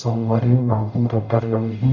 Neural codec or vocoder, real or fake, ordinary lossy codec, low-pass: codec, 44.1 kHz, 0.9 kbps, DAC; fake; none; 7.2 kHz